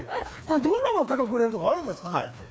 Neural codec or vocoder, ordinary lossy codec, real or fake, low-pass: codec, 16 kHz, 2 kbps, FreqCodec, larger model; none; fake; none